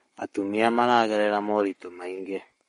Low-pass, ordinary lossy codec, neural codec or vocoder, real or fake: 19.8 kHz; MP3, 48 kbps; codec, 44.1 kHz, 7.8 kbps, Pupu-Codec; fake